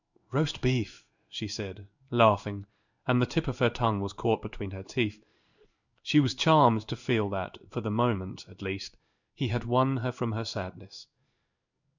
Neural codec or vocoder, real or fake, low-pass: codec, 16 kHz in and 24 kHz out, 1 kbps, XY-Tokenizer; fake; 7.2 kHz